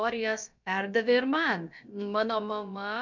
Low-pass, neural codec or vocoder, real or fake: 7.2 kHz; codec, 16 kHz, about 1 kbps, DyCAST, with the encoder's durations; fake